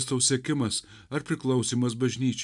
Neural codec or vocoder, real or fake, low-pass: none; real; 10.8 kHz